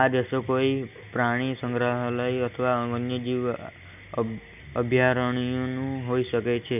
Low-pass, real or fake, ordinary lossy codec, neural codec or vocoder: 3.6 kHz; real; none; none